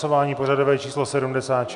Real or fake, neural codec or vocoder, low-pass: real; none; 10.8 kHz